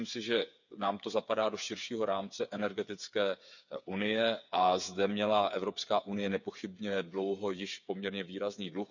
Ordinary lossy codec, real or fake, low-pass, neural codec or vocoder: none; fake; 7.2 kHz; codec, 16 kHz, 8 kbps, FreqCodec, smaller model